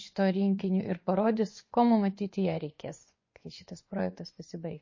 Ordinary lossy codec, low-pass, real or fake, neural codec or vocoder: MP3, 32 kbps; 7.2 kHz; fake; vocoder, 24 kHz, 100 mel bands, Vocos